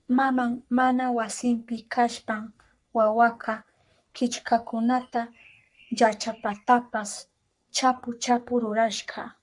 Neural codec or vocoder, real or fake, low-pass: codec, 44.1 kHz, 3.4 kbps, Pupu-Codec; fake; 10.8 kHz